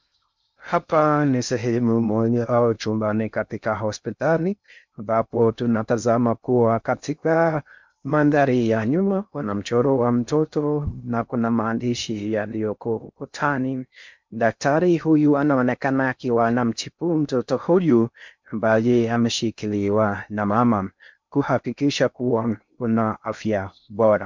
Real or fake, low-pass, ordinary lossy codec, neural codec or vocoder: fake; 7.2 kHz; MP3, 64 kbps; codec, 16 kHz in and 24 kHz out, 0.6 kbps, FocalCodec, streaming, 2048 codes